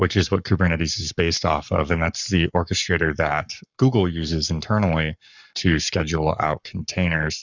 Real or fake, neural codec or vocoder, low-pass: fake; codec, 44.1 kHz, 7.8 kbps, Pupu-Codec; 7.2 kHz